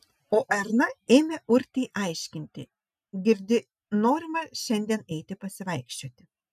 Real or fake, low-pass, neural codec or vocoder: real; 14.4 kHz; none